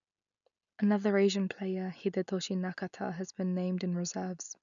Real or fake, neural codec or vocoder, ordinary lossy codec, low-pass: real; none; none; 7.2 kHz